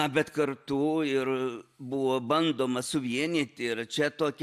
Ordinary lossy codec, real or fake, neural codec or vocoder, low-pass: AAC, 96 kbps; real; none; 14.4 kHz